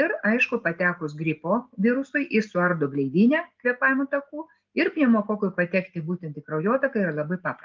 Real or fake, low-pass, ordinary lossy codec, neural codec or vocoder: real; 7.2 kHz; Opus, 32 kbps; none